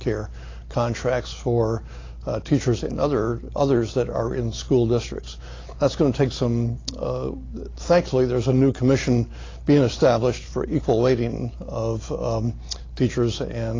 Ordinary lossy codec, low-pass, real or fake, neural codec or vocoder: AAC, 32 kbps; 7.2 kHz; real; none